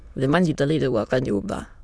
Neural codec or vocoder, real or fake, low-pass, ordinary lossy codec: autoencoder, 22.05 kHz, a latent of 192 numbers a frame, VITS, trained on many speakers; fake; none; none